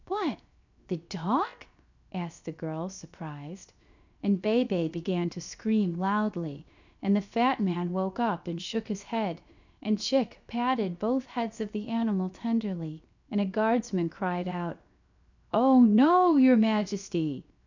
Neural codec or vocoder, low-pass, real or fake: codec, 16 kHz, 0.7 kbps, FocalCodec; 7.2 kHz; fake